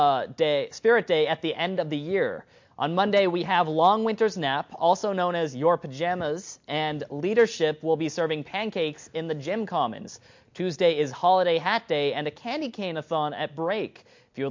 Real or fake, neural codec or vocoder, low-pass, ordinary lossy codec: real; none; 7.2 kHz; MP3, 48 kbps